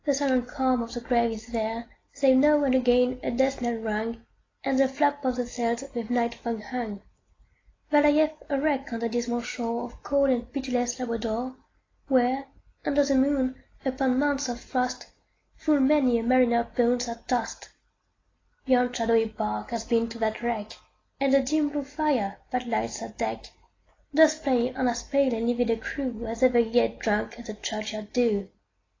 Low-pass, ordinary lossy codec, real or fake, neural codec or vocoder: 7.2 kHz; AAC, 32 kbps; real; none